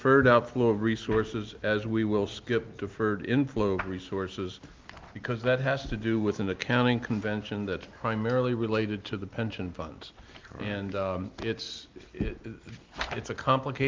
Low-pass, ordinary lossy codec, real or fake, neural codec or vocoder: 7.2 kHz; Opus, 24 kbps; real; none